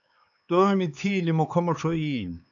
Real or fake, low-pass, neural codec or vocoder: fake; 7.2 kHz; codec, 16 kHz, 4 kbps, X-Codec, HuBERT features, trained on LibriSpeech